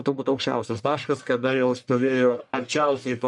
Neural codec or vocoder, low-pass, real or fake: codec, 44.1 kHz, 1.7 kbps, Pupu-Codec; 10.8 kHz; fake